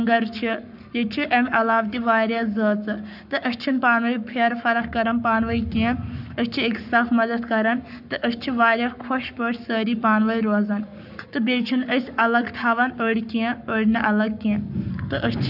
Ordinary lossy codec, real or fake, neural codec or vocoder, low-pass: none; fake; codec, 44.1 kHz, 7.8 kbps, Pupu-Codec; 5.4 kHz